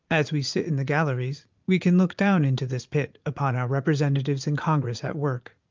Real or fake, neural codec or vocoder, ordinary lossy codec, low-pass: fake; vocoder, 44.1 kHz, 80 mel bands, Vocos; Opus, 24 kbps; 7.2 kHz